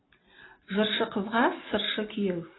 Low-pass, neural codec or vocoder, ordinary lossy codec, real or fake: 7.2 kHz; none; AAC, 16 kbps; real